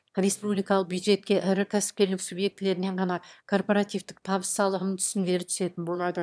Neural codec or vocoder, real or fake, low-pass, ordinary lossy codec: autoencoder, 22.05 kHz, a latent of 192 numbers a frame, VITS, trained on one speaker; fake; none; none